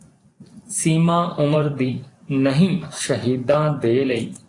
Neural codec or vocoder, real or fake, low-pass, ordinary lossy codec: vocoder, 24 kHz, 100 mel bands, Vocos; fake; 10.8 kHz; AAC, 48 kbps